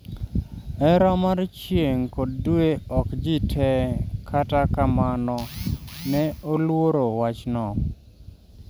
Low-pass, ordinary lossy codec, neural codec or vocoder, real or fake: none; none; none; real